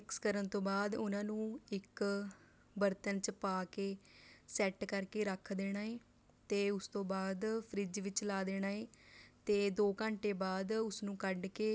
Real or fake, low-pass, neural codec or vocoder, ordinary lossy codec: real; none; none; none